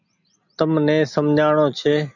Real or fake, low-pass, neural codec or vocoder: real; 7.2 kHz; none